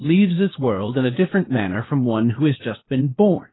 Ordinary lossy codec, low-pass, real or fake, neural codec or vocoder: AAC, 16 kbps; 7.2 kHz; fake; codec, 16 kHz, 0.8 kbps, ZipCodec